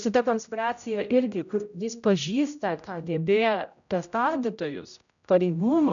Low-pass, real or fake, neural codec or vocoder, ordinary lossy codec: 7.2 kHz; fake; codec, 16 kHz, 0.5 kbps, X-Codec, HuBERT features, trained on general audio; MP3, 96 kbps